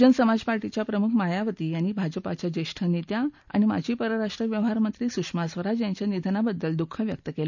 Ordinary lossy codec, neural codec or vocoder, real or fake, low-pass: none; none; real; 7.2 kHz